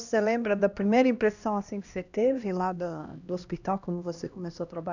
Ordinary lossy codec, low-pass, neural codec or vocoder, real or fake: none; 7.2 kHz; codec, 16 kHz, 1 kbps, X-Codec, HuBERT features, trained on LibriSpeech; fake